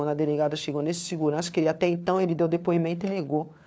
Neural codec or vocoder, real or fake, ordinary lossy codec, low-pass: codec, 16 kHz, 4 kbps, FunCodec, trained on LibriTTS, 50 frames a second; fake; none; none